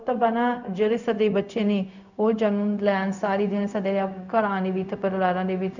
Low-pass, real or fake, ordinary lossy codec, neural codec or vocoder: 7.2 kHz; fake; none; codec, 16 kHz, 0.4 kbps, LongCat-Audio-Codec